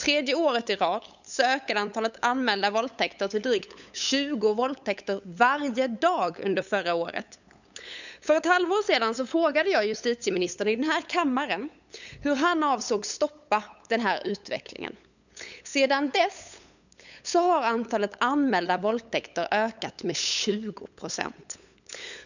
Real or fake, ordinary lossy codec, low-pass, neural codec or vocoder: fake; none; 7.2 kHz; codec, 16 kHz, 8 kbps, FunCodec, trained on LibriTTS, 25 frames a second